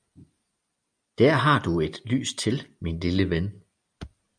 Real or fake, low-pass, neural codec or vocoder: real; 9.9 kHz; none